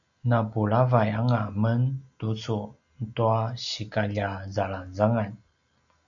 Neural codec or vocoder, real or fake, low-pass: none; real; 7.2 kHz